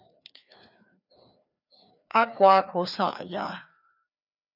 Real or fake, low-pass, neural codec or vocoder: fake; 5.4 kHz; codec, 16 kHz, 2 kbps, FreqCodec, larger model